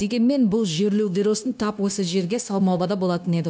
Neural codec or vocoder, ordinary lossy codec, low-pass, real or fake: codec, 16 kHz, 0.9 kbps, LongCat-Audio-Codec; none; none; fake